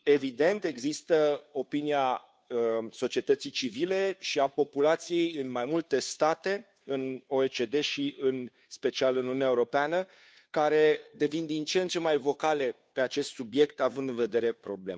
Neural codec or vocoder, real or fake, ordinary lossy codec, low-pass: codec, 16 kHz, 2 kbps, FunCodec, trained on Chinese and English, 25 frames a second; fake; none; none